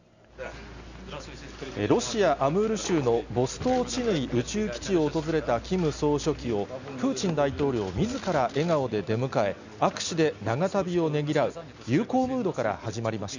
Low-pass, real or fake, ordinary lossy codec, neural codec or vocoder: 7.2 kHz; real; none; none